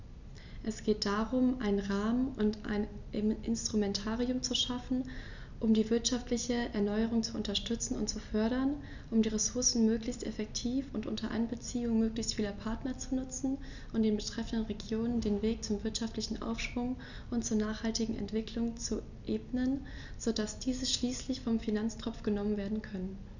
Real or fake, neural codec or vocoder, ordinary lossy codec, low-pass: real; none; none; 7.2 kHz